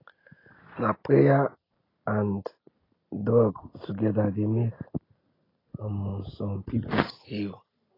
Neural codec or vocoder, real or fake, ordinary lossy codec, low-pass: none; real; AAC, 24 kbps; 5.4 kHz